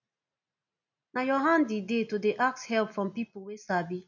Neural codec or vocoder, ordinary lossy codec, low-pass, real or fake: none; none; 7.2 kHz; real